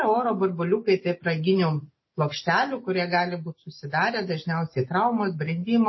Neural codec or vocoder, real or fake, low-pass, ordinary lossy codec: none; real; 7.2 kHz; MP3, 24 kbps